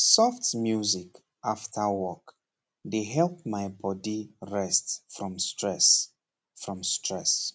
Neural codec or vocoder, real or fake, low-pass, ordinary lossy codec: none; real; none; none